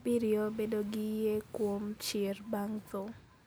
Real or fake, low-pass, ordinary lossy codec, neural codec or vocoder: real; none; none; none